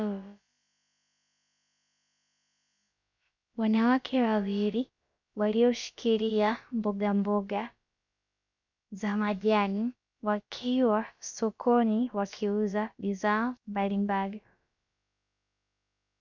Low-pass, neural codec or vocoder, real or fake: 7.2 kHz; codec, 16 kHz, about 1 kbps, DyCAST, with the encoder's durations; fake